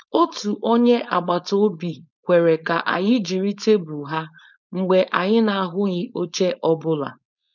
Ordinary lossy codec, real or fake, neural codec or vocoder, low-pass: none; fake; codec, 16 kHz, 4.8 kbps, FACodec; 7.2 kHz